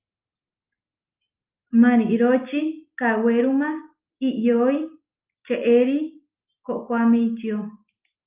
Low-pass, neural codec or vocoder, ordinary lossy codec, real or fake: 3.6 kHz; none; Opus, 24 kbps; real